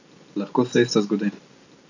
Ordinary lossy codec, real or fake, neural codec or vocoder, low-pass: AAC, 48 kbps; real; none; 7.2 kHz